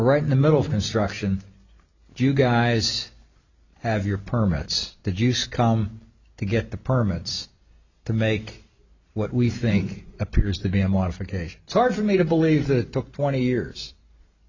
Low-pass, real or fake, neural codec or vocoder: 7.2 kHz; real; none